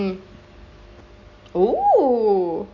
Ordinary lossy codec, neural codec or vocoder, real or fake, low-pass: MP3, 64 kbps; none; real; 7.2 kHz